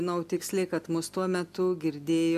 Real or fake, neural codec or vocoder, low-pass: real; none; 14.4 kHz